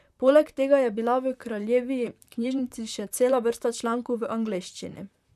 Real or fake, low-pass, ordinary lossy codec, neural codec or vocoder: fake; 14.4 kHz; none; vocoder, 44.1 kHz, 128 mel bands, Pupu-Vocoder